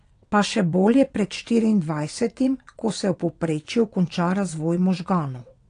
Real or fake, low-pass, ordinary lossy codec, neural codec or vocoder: fake; 9.9 kHz; AAC, 48 kbps; vocoder, 22.05 kHz, 80 mel bands, WaveNeXt